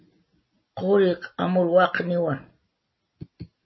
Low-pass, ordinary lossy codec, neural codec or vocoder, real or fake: 7.2 kHz; MP3, 24 kbps; none; real